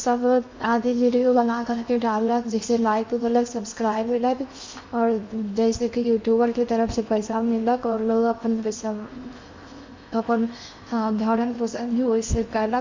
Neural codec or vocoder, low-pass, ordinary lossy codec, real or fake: codec, 16 kHz in and 24 kHz out, 0.8 kbps, FocalCodec, streaming, 65536 codes; 7.2 kHz; MP3, 64 kbps; fake